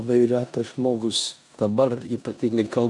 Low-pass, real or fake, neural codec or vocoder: 10.8 kHz; fake; codec, 16 kHz in and 24 kHz out, 0.9 kbps, LongCat-Audio-Codec, four codebook decoder